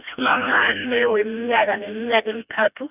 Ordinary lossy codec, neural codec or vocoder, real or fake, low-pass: none; codec, 16 kHz, 1 kbps, FreqCodec, smaller model; fake; 3.6 kHz